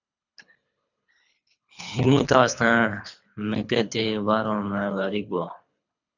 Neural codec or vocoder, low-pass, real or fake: codec, 24 kHz, 3 kbps, HILCodec; 7.2 kHz; fake